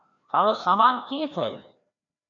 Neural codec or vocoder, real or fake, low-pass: codec, 16 kHz, 1 kbps, FreqCodec, larger model; fake; 7.2 kHz